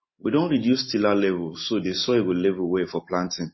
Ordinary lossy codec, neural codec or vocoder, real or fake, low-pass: MP3, 24 kbps; none; real; 7.2 kHz